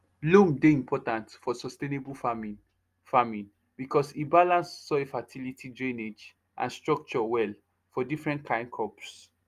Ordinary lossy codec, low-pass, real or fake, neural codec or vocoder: Opus, 32 kbps; 14.4 kHz; real; none